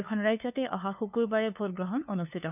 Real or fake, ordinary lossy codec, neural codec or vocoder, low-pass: fake; none; codec, 16 kHz, 2 kbps, FunCodec, trained on LibriTTS, 25 frames a second; 3.6 kHz